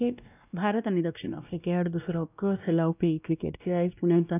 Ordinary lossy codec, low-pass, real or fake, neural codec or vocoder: none; 3.6 kHz; fake; codec, 16 kHz, 1 kbps, X-Codec, WavLM features, trained on Multilingual LibriSpeech